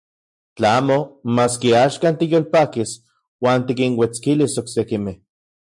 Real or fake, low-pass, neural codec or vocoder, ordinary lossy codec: real; 10.8 kHz; none; MP3, 64 kbps